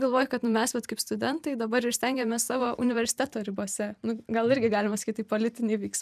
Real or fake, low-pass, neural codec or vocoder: fake; 14.4 kHz; vocoder, 44.1 kHz, 128 mel bands every 512 samples, BigVGAN v2